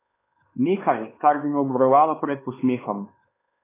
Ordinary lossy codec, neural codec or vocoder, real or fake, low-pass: AAC, 16 kbps; codec, 16 kHz, 4 kbps, X-Codec, HuBERT features, trained on LibriSpeech; fake; 3.6 kHz